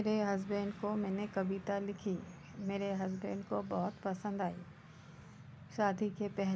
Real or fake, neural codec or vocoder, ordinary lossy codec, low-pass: real; none; none; none